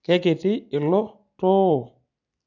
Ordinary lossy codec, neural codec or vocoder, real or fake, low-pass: none; none; real; 7.2 kHz